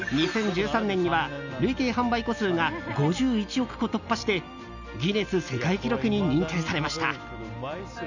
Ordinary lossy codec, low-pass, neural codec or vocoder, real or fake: none; 7.2 kHz; none; real